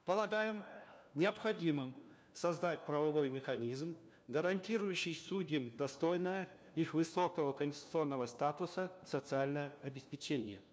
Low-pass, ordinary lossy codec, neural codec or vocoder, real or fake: none; none; codec, 16 kHz, 1 kbps, FunCodec, trained on LibriTTS, 50 frames a second; fake